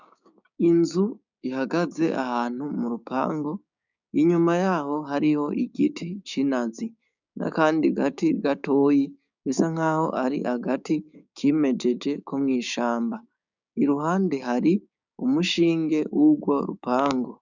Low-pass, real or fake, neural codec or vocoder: 7.2 kHz; fake; codec, 16 kHz, 6 kbps, DAC